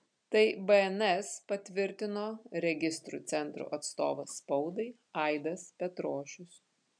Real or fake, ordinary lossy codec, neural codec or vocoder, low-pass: real; MP3, 96 kbps; none; 9.9 kHz